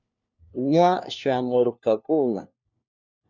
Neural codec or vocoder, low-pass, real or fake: codec, 16 kHz, 1 kbps, FunCodec, trained on LibriTTS, 50 frames a second; 7.2 kHz; fake